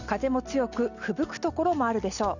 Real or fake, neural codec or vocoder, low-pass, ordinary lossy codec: real; none; 7.2 kHz; none